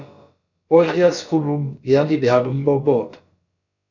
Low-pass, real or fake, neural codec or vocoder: 7.2 kHz; fake; codec, 16 kHz, about 1 kbps, DyCAST, with the encoder's durations